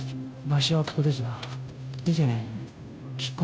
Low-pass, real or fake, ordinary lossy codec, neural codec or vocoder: none; fake; none; codec, 16 kHz, 0.5 kbps, FunCodec, trained on Chinese and English, 25 frames a second